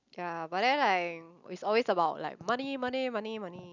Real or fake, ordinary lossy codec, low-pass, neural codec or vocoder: real; none; 7.2 kHz; none